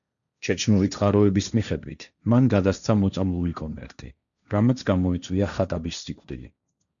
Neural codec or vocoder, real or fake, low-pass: codec, 16 kHz, 1.1 kbps, Voila-Tokenizer; fake; 7.2 kHz